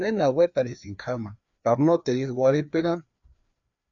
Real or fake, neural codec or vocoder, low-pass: fake; codec, 16 kHz, 2 kbps, FreqCodec, larger model; 7.2 kHz